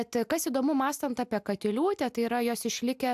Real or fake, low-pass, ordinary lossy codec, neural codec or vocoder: real; 14.4 kHz; AAC, 96 kbps; none